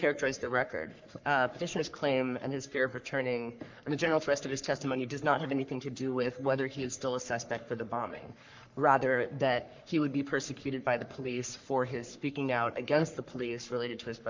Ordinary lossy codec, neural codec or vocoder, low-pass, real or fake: MP3, 64 kbps; codec, 44.1 kHz, 3.4 kbps, Pupu-Codec; 7.2 kHz; fake